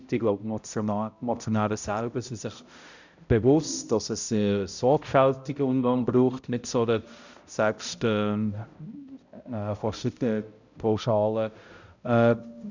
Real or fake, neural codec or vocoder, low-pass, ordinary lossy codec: fake; codec, 16 kHz, 0.5 kbps, X-Codec, HuBERT features, trained on balanced general audio; 7.2 kHz; Opus, 64 kbps